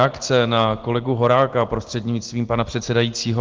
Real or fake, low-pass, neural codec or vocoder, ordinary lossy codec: real; 7.2 kHz; none; Opus, 16 kbps